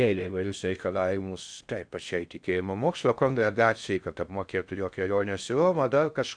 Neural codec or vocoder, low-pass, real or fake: codec, 16 kHz in and 24 kHz out, 0.6 kbps, FocalCodec, streaming, 4096 codes; 9.9 kHz; fake